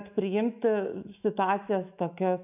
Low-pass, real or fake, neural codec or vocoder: 3.6 kHz; fake; autoencoder, 48 kHz, 128 numbers a frame, DAC-VAE, trained on Japanese speech